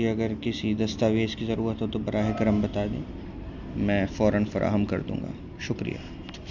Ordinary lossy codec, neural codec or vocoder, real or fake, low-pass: none; none; real; 7.2 kHz